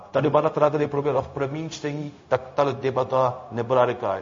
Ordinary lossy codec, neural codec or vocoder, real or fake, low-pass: MP3, 32 kbps; codec, 16 kHz, 0.4 kbps, LongCat-Audio-Codec; fake; 7.2 kHz